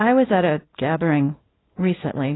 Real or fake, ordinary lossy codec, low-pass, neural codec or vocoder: fake; AAC, 16 kbps; 7.2 kHz; codec, 24 kHz, 0.9 kbps, WavTokenizer, small release